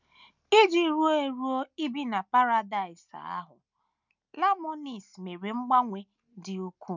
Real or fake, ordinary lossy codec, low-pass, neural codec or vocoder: real; none; 7.2 kHz; none